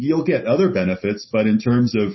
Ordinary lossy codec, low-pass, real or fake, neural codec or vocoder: MP3, 24 kbps; 7.2 kHz; real; none